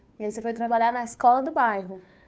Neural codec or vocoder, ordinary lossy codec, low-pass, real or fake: codec, 16 kHz, 2 kbps, FunCodec, trained on Chinese and English, 25 frames a second; none; none; fake